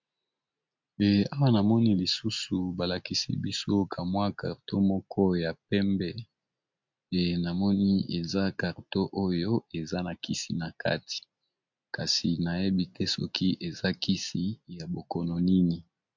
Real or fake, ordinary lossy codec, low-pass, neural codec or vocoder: real; MP3, 64 kbps; 7.2 kHz; none